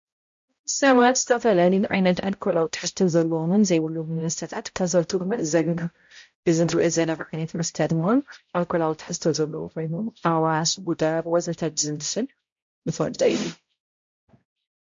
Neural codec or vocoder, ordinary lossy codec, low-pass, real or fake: codec, 16 kHz, 0.5 kbps, X-Codec, HuBERT features, trained on balanced general audio; MP3, 48 kbps; 7.2 kHz; fake